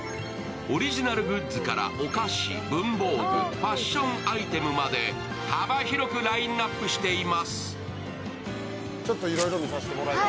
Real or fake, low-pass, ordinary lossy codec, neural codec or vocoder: real; none; none; none